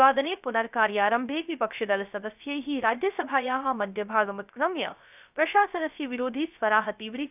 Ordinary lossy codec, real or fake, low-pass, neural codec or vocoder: none; fake; 3.6 kHz; codec, 16 kHz, 0.3 kbps, FocalCodec